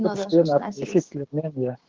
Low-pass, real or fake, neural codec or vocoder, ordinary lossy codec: 7.2 kHz; fake; codec, 16 kHz, 6 kbps, DAC; Opus, 16 kbps